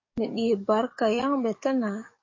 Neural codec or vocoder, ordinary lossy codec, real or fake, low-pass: codec, 44.1 kHz, 7.8 kbps, DAC; MP3, 32 kbps; fake; 7.2 kHz